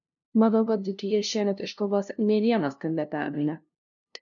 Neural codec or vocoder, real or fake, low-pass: codec, 16 kHz, 0.5 kbps, FunCodec, trained on LibriTTS, 25 frames a second; fake; 7.2 kHz